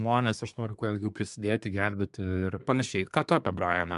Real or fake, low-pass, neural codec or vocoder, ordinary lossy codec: fake; 10.8 kHz; codec, 24 kHz, 1 kbps, SNAC; MP3, 96 kbps